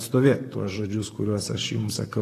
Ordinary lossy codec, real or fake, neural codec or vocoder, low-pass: AAC, 48 kbps; fake; vocoder, 44.1 kHz, 128 mel bands, Pupu-Vocoder; 14.4 kHz